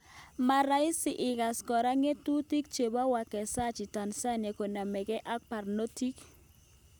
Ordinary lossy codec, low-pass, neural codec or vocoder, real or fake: none; none; none; real